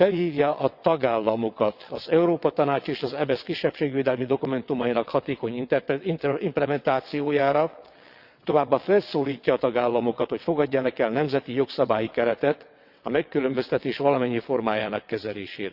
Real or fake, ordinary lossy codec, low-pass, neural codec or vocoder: fake; Opus, 64 kbps; 5.4 kHz; vocoder, 22.05 kHz, 80 mel bands, WaveNeXt